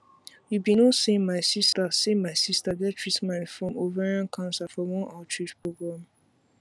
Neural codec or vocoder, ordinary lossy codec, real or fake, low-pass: none; none; real; none